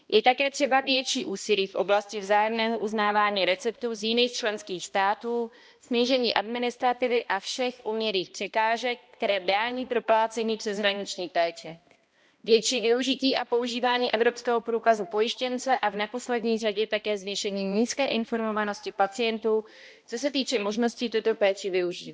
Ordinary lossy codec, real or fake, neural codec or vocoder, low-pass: none; fake; codec, 16 kHz, 1 kbps, X-Codec, HuBERT features, trained on balanced general audio; none